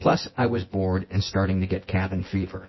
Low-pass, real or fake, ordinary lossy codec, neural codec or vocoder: 7.2 kHz; fake; MP3, 24 kbps; vocoder, 24 kHz, 100 mel bands, Vocos